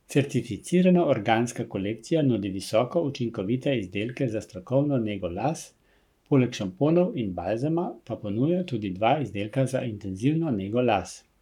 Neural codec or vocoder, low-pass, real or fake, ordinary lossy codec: codec, 44.1 kHz, 7.8 kbps, Pupu-Codec; 19.8 kHz; fake; none